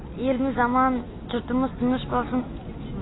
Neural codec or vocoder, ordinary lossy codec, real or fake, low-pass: none; AAC, 16 kbps; real; 7.2 kHz